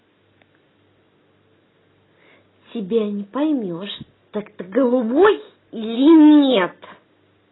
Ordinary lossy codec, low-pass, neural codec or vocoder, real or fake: AAC, 16 kbps; 7.2 kHz; none; real